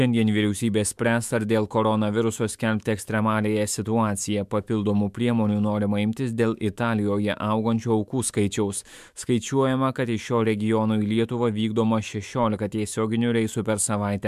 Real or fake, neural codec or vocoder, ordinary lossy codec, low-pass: fake; autoencoder, 48 kHz, 128 numbers a frame, DAC-VAE, trained on Japanese speech; MP3, 96 kbps; 14.4 kHz